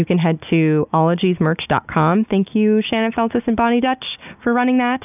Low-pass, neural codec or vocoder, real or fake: 3.6 kHz; none; real